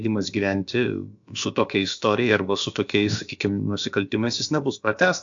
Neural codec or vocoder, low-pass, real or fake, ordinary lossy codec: codec, 16 kHz, about 1 kbps, DyCAST, with the encoder's durations; 7.2 kHz; fake; AAC, 64 kbps